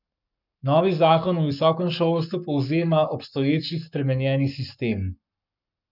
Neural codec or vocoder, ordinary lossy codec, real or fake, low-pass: codec, 44.1 kHz, 7.8 kbps, Pupu-Codec; none; fake; 5.4 kHz